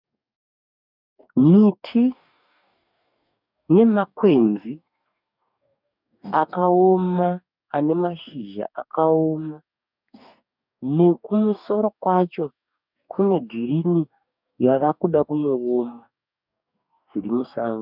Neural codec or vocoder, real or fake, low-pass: codec, 44.1 kHz, 2.6 kbps, DAC; fake; 5.4 kHz